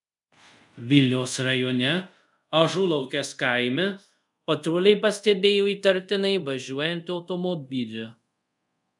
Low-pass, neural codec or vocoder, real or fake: 10.8 kHz; codec, 24 kHz, 0.5 kbps, DualCodec; fake